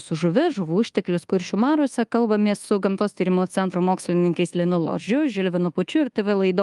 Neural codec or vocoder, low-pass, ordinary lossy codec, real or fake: codec, 24 kHz, 1.2 kbps, DualCodec; 10.8 kHz; Opus, 32 kbps; fake